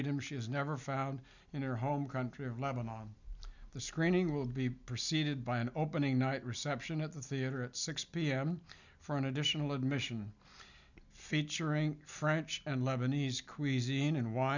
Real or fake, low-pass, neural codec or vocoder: real; 7.2 kHz; none